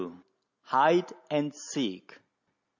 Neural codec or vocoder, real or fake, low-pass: none; real; 7.2 kHz